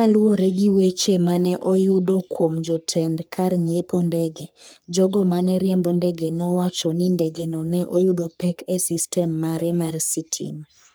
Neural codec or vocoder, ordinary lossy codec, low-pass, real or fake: codec, 44.1 kHz, 3.4 kbps, Pupu-Codec; none; none; fake